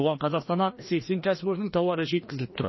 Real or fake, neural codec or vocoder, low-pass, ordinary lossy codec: fake; codec, 16 kHz, 1 kbps, FreqCodec, larger model; 7.2 kHz; MP3, 24 kbps